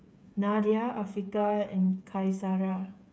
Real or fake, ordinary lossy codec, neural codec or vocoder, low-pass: fake; none; codec, 16 kHz, 8 kbps, FreqCodec, smaller model; none